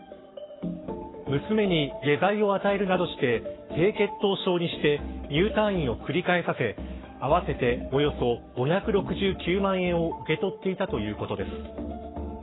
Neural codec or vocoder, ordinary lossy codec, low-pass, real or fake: codec, 44.1 kHz, 7.8 kbps, Pupu-Codec; AAC, 16 kbps; 7.2 kHz; fake